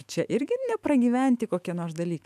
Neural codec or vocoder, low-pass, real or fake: autoencoder, 48 kHz, 128 numbers a frame, DAC-VAE, trained on Japanese speech; 14.4 kHz; fake